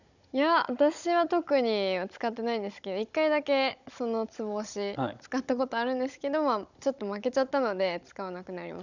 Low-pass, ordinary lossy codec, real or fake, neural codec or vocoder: 7.2 kHz; none; fake; codec, 16 kHz, 16 kbps, FunCodec, trained on Chinese and English, 50 frames a second